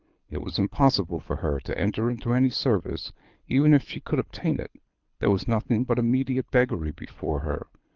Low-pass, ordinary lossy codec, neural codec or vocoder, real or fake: 7.2 kHz; Opus, 24 kbps; codec, 24 kHz, 6 kbps, HILCodec; fake